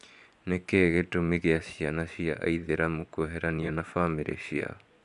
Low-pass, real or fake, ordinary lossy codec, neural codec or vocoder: 10.8 kHz; fake; none; vocoder, 24 kHz, 100 mel bands, Vocos